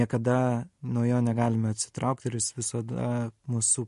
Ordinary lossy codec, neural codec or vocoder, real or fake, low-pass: MP3, 48 kbps; none; real; 14.4 kHz